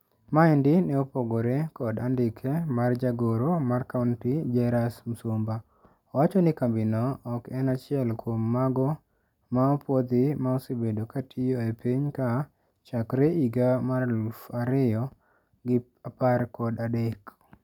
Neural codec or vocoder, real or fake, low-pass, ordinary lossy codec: none; real; 19.8 kHz; none